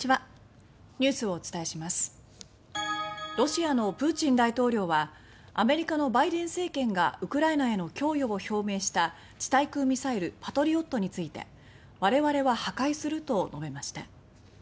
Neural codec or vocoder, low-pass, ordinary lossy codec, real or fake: none; none; none; real